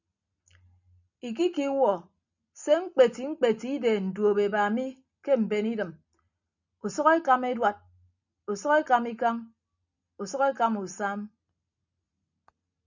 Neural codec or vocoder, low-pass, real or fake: none; 7.2 kHz; real